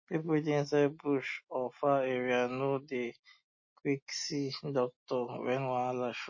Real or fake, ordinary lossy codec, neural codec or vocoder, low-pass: real; MP3, 32 kbps; none; 7.2 kHz